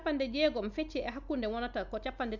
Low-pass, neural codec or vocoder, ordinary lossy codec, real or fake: 7.2 kHz; none; none; real